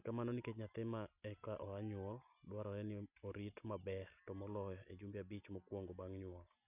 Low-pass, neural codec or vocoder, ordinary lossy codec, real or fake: 3.6 kHz; none; none; real